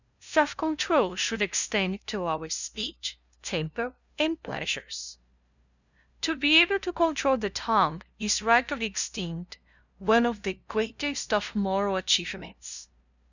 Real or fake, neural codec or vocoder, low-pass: fake; codec, 16 kHz, 0.5 kbps, FunCodec, trained on LibriTTS, 25 frames a second; 7.2 kHz